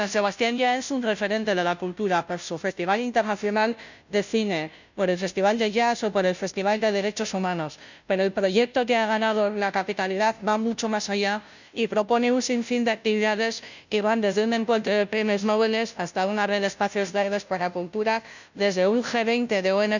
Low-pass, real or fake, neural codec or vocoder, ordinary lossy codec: 7.2 kHz; fake; codec, 16 kHz, 0.5 kbps, FunCodec, trained on Chinese and English, 25 frames a second; none